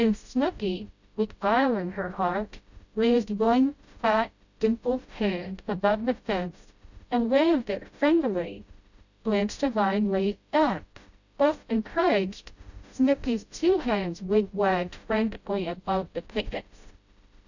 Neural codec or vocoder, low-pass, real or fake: codec, 16 kHz, 0.5 kbps, FreqCodec, smaller model; 7.2 kHz; fake